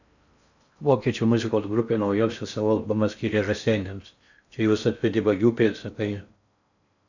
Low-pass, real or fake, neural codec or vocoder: 7.2 kHz; fake; codec, 16 kHz in and 24 kHz out, 0.6 kbps, FocalCodec, streaming, 4096 codes